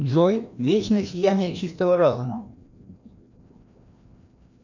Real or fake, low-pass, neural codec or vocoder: fake; 7.2 kHz; codec, 16 kHz, 1 kbps, FreqCodec, larger model